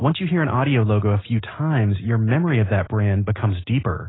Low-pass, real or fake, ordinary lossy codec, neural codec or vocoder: 7.2 kHz; real; AAC, 16 kbps; none